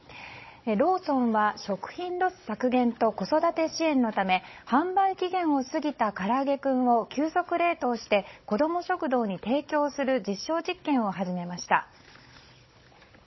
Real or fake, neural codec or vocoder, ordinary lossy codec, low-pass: fake; codec, 16 kHz, 16 kbps, FunCodec, trained on Chinese and English, 50 frames a second; MP3, 24 kbps; 7.2 kHz